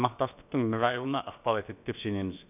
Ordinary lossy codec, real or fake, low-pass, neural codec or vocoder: none; fake; 3.6 kHz; codec, 16 kHz, about 1 kbps, DyCAST, with the encoder's durations